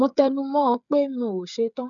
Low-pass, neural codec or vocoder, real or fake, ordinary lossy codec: 7.2 kHz; codec, 16 kHz, 8 kbps, FreqCodec, smaller model; fake; none